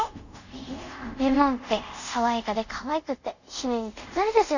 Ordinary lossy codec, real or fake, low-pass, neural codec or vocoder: none; fake; 7.2 kHz; codec, 24 kHz, 0.5 kbps, DualCodec